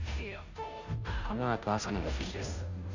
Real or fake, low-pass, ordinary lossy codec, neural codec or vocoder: fake; 7.2 kHz; Opus, 64 kbps; codec, 16 kHz, 0.5 kbps, FunCodec, trained on Chinese and English, 25 frames a second